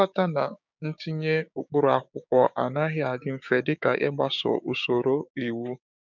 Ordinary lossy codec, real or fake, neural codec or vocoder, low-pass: none; fake; codec, 16 kHz, 6 kbps, DAC; 7.2 kHz